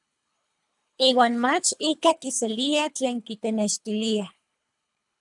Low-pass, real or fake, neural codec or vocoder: 10.8 kHz; fake; codec, 24 kHz, 3 kbps, HILCodec